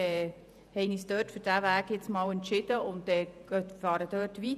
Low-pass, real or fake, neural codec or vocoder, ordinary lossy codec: 14.4 kHz; fake; vocoder, 48 kHz, 128 mel bands, Vocos; none